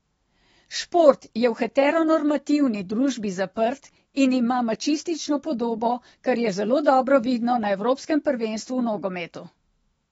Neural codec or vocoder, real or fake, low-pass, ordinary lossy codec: autoencoder, 48 kHz, 128 numbers a frame, DAC-VAE, trained on Japanese speech; fake; 19.8 kHz; AAC, 24 kbps